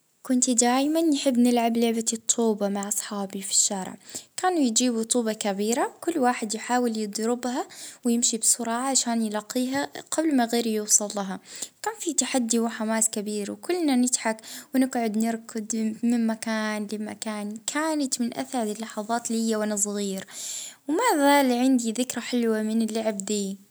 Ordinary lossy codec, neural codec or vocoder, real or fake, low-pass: none; none; real; none